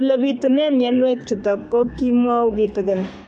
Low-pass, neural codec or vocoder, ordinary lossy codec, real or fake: 10.8 kHz; codec, 32 kHz, 1.9 kbps, SNAC; MP3, 64 kbps; fake